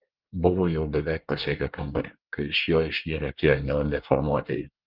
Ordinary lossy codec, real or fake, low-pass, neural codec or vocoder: Opus, 24 kbps; fake; 5.4 kHz; codec, 24 kHz, 1 kbps, SNAC